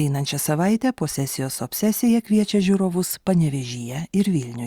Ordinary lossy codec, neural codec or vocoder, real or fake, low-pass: Opus, 64 kbps; none; real; 19.8 kHz